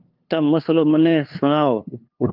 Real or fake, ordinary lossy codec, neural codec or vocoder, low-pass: fake; Opus, 24 kbps; codec, 16 kHz, 2 kbps, FunCodec, trained on LibriTTS, 25 frames a second; 5.4 kHz